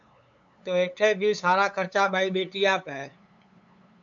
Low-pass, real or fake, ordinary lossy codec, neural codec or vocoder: 7.2 kHz; fake; AAC, 64 kbps; codec, 16 kHz, 8 kbps, FunCodec, trained on LibriTTS, 25 frames a second